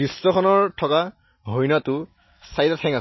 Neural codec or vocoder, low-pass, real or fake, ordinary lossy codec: none; 7.2 kHz; real; MP3, 24 kbps